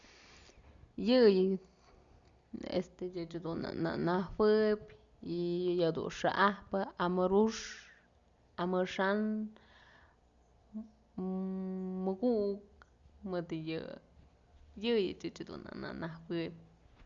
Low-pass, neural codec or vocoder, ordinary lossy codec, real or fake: 7.2 kHz; none; Opus, 64 kbps; real